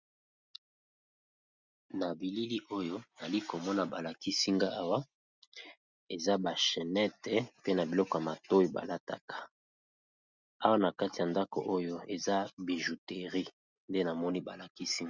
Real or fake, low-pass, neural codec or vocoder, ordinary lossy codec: real; 7.2 kHz; none; Opus, 64 kbps